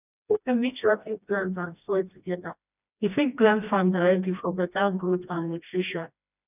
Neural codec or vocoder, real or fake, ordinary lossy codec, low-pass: codec, 16 kHz, 1 kbps, FreqCodec, smaller model; fake; none; 3.6 kHz